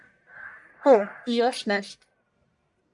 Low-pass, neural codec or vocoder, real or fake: 10.8 kHz; codec, 44.1 kHz, 1.7 kbps, Pupu-Codec; fake